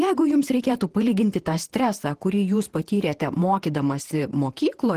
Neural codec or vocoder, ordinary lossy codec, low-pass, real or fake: vocoder, 48 kHz, 128 mel bands, Vocos; Opus, 16 kbps; 14.4 kHz; fake